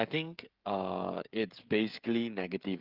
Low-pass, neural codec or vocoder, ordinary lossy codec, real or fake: 5.4 kHz; codec, 16 kHz, 16 kbps, FreqCodec, smaller model; Opus, 32 kbps; fake